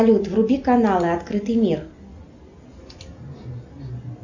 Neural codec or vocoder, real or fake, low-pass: none; real; 7.2 kHz